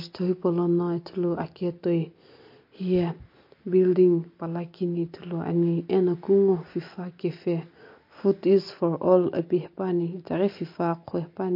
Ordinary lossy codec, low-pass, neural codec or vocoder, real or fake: MP3, 32 kbps; 5.4 kHz; none; real